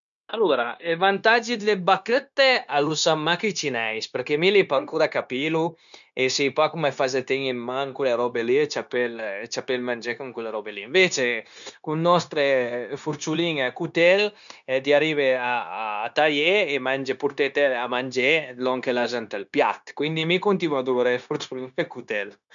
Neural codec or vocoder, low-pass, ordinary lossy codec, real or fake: codec, 16 kHz, 0.9 kbps, LongCat-Audio-Codec; 7.2 kHz; none; fake